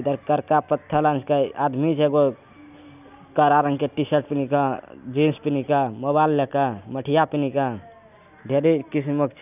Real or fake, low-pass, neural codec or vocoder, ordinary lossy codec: real; 3.6 kHz; none; none